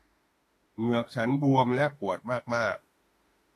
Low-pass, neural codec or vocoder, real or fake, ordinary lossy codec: 14.4 kHz; autoencoder, 48 kHz, 32 numbers a frame, DAC-VAE, trained on Japanese speech; fake; AAC, 48 kbps